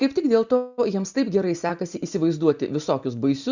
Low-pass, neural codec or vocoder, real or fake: 7.2 kHz; none; real